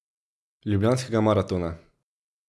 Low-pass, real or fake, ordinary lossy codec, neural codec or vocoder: none; real; none; none